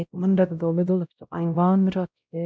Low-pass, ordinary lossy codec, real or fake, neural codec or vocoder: none; none; fake; codec, 16 kHz, 0.5 kbps, X-Codec, WavLM features, trained on Multilingual LibriSpeech